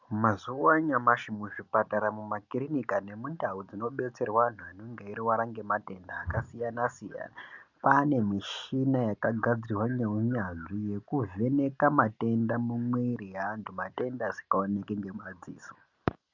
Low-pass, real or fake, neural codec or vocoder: 7.2 kHz; real; none